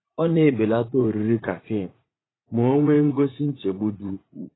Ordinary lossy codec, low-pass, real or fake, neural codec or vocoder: AAC, 16 kbps; 7.2 kHz; fake; vocoder, 44.1 kHz, 80 mel bands, Vocos